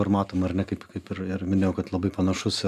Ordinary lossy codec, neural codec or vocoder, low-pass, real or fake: AAC, 96 kbps; none; 14.4 kHz; real